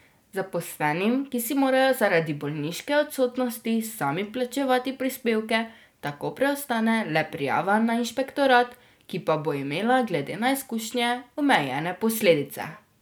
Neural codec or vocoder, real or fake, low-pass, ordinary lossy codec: none; real; none; none